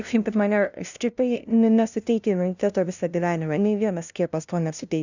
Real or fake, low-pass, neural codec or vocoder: fake; 7.2 kHz; codec, 16 kHz, 0.5 kbps, FunCodec, trained on LibriTTS, 25 frames a second